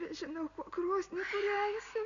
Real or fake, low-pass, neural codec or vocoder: real; 7.2 kHz; none